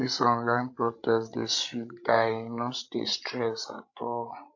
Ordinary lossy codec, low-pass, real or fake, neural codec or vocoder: AAC, 48 kbps; 7.2 kHz; fake; codec, 16 kHz, 16 kbps, FreqCodec, larger model